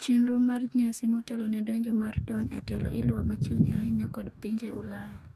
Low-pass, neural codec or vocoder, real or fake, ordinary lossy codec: 14.4 kHz; codec, 44.1 kHz, 2.6 kbps, DAC; fake; none